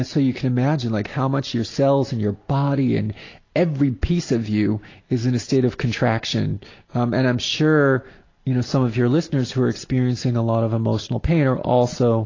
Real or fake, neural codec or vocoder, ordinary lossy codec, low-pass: real; none; AAC, 32 kbps; 7.2 kHz